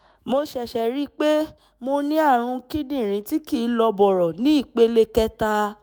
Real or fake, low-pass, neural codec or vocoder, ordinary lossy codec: fake; none; autoencoder, 48 kHz, 128 numbers a frame, DAC-VAE, trained on Japanese speech; none